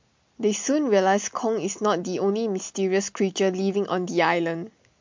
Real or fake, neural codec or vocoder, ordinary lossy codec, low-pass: real; none; MP3, 48 kbps; 7.2 kHz